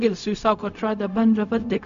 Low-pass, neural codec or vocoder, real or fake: 7.2 kHz; codec, 16 kHz, 0.4 kbps, LongCat-Audio-Codec; fake